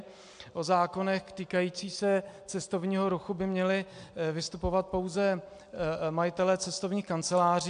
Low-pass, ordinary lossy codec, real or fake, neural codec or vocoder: 9.9 kHz; AAC, 64 kbps; real; none